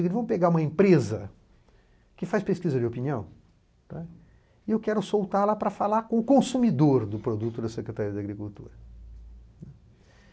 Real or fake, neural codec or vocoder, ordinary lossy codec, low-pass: real; none; none; none